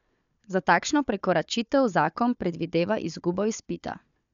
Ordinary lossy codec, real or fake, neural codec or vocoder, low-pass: MP3, 96 kbps; fake; codec, 16 kHz, 4 kbps, FunCodec, trained on Chinese and English, 50 frames a second; 7.2 kHz